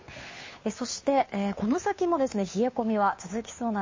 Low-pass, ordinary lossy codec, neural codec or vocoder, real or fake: 7.2 kHz; MP3, 32 kbps; codec, 16 kHz, 2 kbps, FunCodec, trained on Chinese and English, 25 frames a second; fake